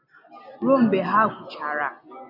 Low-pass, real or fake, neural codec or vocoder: 5.4 kHz; real; none